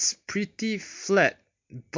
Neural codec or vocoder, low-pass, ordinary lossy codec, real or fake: none; 7.2 kHz; MP3, 48 kbps; real